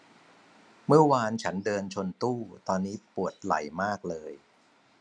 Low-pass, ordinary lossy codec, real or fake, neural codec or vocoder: none; none; real; none